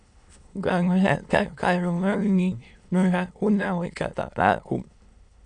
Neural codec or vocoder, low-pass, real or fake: autoencoder, 22.05 kHz, a latent of 192 numbers a frame, VITS, trained on many speakers; 9.9 kHz; fake